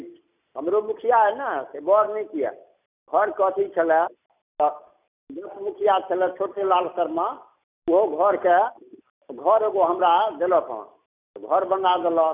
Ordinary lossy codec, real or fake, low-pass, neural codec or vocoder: none; real; 3.6 kHz; none